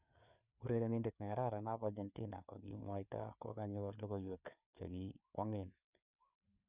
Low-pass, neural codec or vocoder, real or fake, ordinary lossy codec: 3.6 kHz; codec, 16 kHz, 4 kbps, FreqCodec, larger model; fake; none